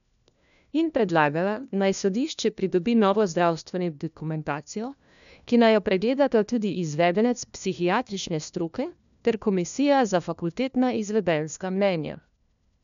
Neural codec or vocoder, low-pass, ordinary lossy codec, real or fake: codec, 16 kHz, 1 kbps, FunCodec, trained on LibriTTS, 50 frames a second; 7.2 kHz; none; fake